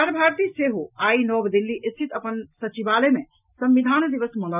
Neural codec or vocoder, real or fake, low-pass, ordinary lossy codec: none; real; 3.6 kHz; none